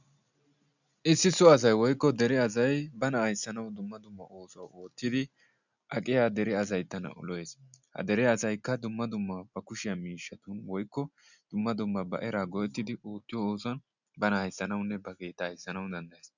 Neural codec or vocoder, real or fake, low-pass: none; real; 7.2 kHz